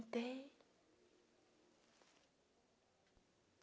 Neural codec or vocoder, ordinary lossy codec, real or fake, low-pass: none; none; real; none